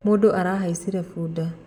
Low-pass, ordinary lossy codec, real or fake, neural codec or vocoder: 19.8 kHz; none; real; none